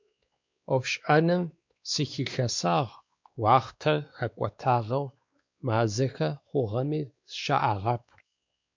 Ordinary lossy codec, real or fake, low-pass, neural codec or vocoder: MP3, 64 kbps; fake; 7.2 kHz; codec, 16 kHz, 2 kbps, X-Codec, WavLM features, trained on Multilingual LibriSpeech